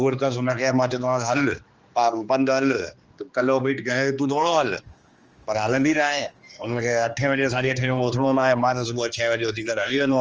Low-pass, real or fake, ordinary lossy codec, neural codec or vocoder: 7.2 kHz; fake; Opus, 24 kbps; codec, 16 kHz, 2 kbps, X-Codec, HuBERT features, trained on general audio